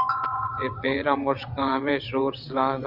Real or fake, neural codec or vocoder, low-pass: fake; vocoder, 22.05 kHz, 80 mel bands, WaveNeXt; 5.4 kHz